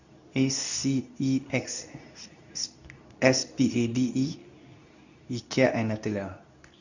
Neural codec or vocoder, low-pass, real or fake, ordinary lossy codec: codec, 24 kHz, 0.9 kbps, WavTokenizer, medium speech release version 2; 7.2 kHz; fake; none